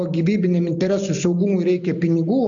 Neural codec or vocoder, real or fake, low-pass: none; real; 7.2 kHz